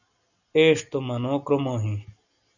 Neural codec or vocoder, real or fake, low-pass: none; real; 7.2 kHz